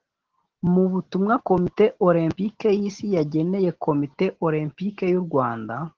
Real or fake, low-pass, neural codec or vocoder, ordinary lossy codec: real; 7.2 kHz; none; Opus, 16 kbps